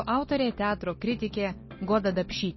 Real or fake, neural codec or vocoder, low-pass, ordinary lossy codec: real; none; 7.2 kHz; MP3, 24 kbps